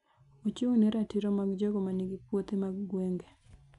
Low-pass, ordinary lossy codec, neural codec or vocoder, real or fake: 10.8 kHz; none; none; real